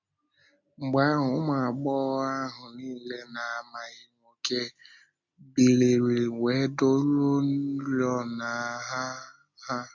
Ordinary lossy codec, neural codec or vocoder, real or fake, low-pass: MP3, 64 kbps; none; real; 7.2 kHz